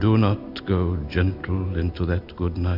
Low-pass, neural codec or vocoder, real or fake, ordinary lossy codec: 5.4 kHz; none; real; AAC, 48 kbps